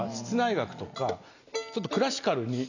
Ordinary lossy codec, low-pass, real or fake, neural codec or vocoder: none; 7.2 kHz; real; none